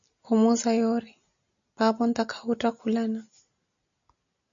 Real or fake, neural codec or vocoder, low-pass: real; none; 7.2 kHz